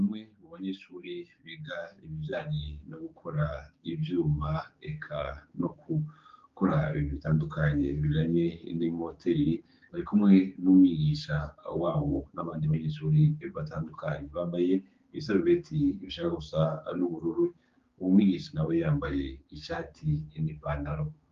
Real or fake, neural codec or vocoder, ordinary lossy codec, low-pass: fake; codec, 16 kHz, 4 kbps, X-Codec, HuBERT features, trained on general audio; Opus, 32 kbps; 7.2 kHz